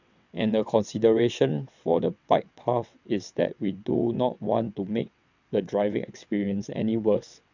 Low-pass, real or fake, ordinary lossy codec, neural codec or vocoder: 7.2 kHz; fake; none; vocoder, 22.05 kHz, 80 mel bands, WaveNeXt